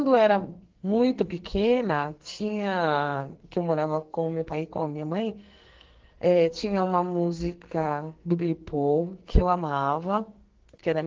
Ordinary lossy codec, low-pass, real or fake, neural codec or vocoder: Opus, 16 kbps; 7.2 kHz; fake; codec, 44.1 kHz, 2.6 kbps, SNAC